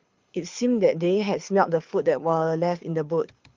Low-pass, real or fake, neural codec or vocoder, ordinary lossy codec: 7.2 kHz; fake; codec, 24 kHz, 6 kbps, HILCodec; Opus, 32 kbps